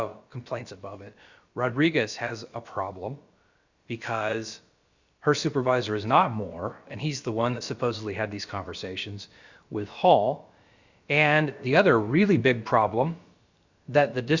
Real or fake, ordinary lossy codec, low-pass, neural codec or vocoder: fake; Opus, 64 kbps; 7.2 kHz; codec, 16 kHz, about 1 kbps, DyCAST, with the encoder's durations